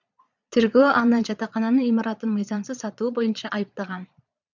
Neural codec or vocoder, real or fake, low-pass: vocoder, 22.05 kHz, 80 mel bands, Vocos; fake; 7.2 kHz